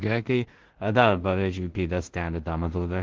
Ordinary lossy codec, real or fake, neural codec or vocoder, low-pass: Opus, 16 kbps; fake; codec, 16 kHz in and 24 kHz out, 0.4 kbps, LongCat-Audio-Codec, two codebook decoder; 7.2 kHz